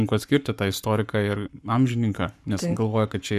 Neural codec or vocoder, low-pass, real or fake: codec, 44.1 kHz, 7.8 kbps, Pupu-Codec; 14.4 kHz; fake